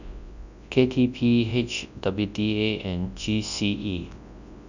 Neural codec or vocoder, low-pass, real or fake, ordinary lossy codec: codec, 24 kHz, 0.9 kbps, WavTokenizer, large speech release; 7.2 kHz; fake; none